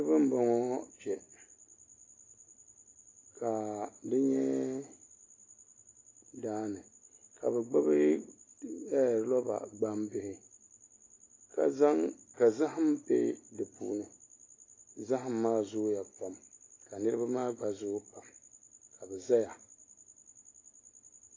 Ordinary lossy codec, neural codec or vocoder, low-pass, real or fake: AAC, 32 kbps; none; 7.2 kHz; real